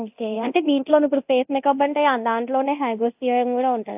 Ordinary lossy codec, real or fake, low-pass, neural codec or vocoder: AAC, 32 kbps; fake; 3.6 kHz; codec, 24 kHz, 0.9 kbps, DualCodec